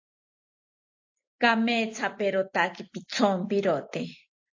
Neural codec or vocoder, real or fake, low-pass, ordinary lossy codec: none; real; 7.2 kHz; AAC, 48 kbps